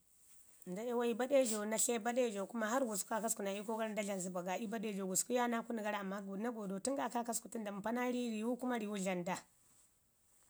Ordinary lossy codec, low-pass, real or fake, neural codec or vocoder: none; none; real; none